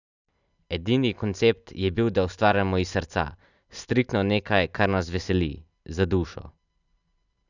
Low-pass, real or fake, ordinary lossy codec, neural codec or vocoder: 7.2 kHz; real; none; none